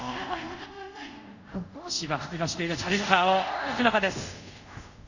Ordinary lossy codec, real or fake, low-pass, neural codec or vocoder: none; fake; 7.2 kHz; codec, 24 kHz, 0.5 kbps, DualCodec